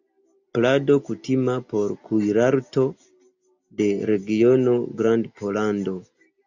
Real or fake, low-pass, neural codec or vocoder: real; 7.2 kHz; none